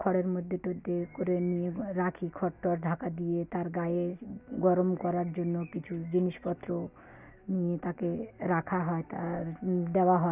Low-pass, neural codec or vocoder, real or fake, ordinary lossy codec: 3.6 kHz; none; real; none